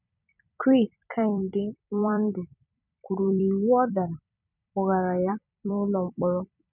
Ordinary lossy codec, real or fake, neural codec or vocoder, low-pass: none; fake; vocoder, 44.1 kHz, 128 mel bands every 256 samples, BigVGAN v2; 3.6 kHz